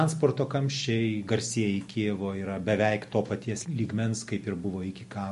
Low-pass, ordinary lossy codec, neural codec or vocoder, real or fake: 14.4 kHz; MP3, 48 kbps; none; real